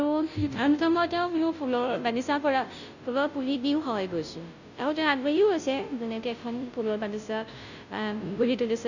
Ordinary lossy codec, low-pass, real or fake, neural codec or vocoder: none; 7.2 kHz; fake; codec, 16 kHz, 0.5 kbps, FunCodec, trained on Chinese and English, 25 frames a second